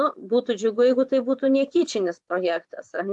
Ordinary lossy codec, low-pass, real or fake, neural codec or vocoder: AAC, 64 kbps; 10.8 kHz; fake; vocoder, 24 kHz, 100 mel bands, Vocos